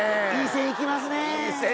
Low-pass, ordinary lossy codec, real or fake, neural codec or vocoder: none; none; real; none